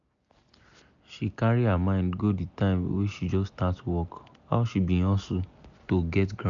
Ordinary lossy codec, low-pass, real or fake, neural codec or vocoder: none; 7.2 kHz; real; none